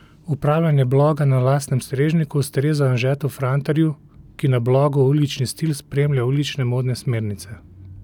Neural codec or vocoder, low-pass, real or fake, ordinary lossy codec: none; 19.8 kHz; real; none